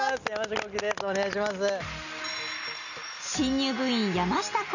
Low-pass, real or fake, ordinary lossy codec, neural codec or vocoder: 7.2 kHz; real; none; none